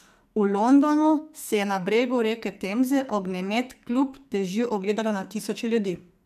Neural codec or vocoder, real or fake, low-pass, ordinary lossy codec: codec, 44.1 kHz, 2.6 kbps, SNAC; fake; 14.4 kHz; MP3, 96 kbps